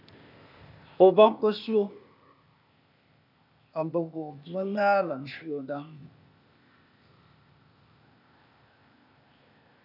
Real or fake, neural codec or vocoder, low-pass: fake; codec, 16 kHz, 0.8 kbps, ZipCodec; 5.4 kHz